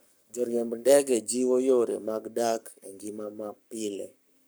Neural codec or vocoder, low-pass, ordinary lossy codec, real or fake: codec, 44.1 kHz, 7.8 kbps, Pupu-Codec; none; none; fake